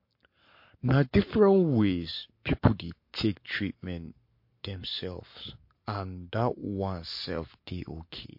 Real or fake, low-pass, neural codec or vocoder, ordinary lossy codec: fake; 5.4 kHz; codec, 44.1 kHz, 7.8 kbps, Pupu-Codec; MP3, 32 kbps